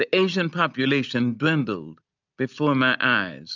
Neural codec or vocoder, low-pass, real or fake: none; 7.2 kHz; real